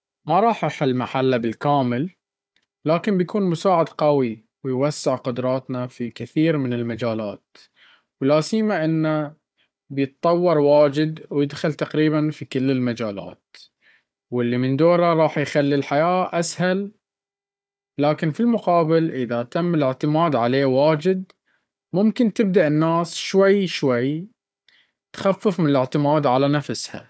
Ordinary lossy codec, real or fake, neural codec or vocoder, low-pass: none; fake; codec, 16 kHz, 4 kbps, FunCodec, trained on Chinese and English, 50 frames a second; none